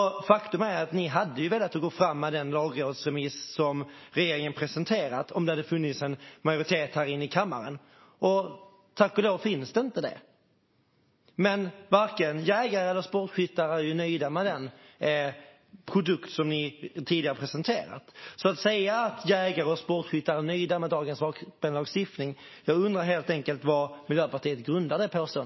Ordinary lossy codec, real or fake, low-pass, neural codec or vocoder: MP3, 24 kbps; real; 7.2 kHz; none